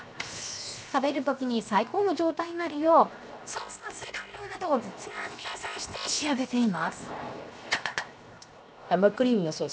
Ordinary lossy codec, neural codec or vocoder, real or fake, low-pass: none; codec, 16 kHz, 0.7 kbps, FocalCodec; fake; none